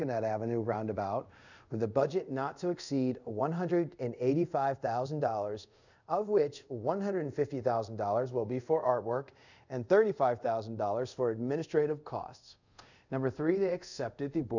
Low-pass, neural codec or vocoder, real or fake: 7.2 kHz; codec, 24 kHz, 0.5 kbps, DualCodec; fake